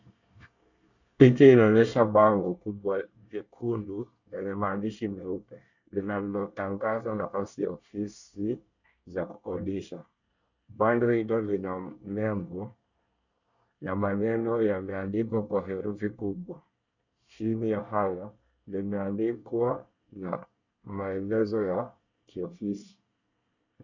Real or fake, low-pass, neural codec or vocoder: fake; 7.2 kHz; codec, 24 kHz, 1 kbps, SNAC